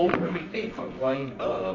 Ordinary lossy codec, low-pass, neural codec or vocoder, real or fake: AAC, 32 kbps; 7.2 kHz; codec, 24 kHz, 0.9 kbps, WavTokenizer, medium music audio release; fake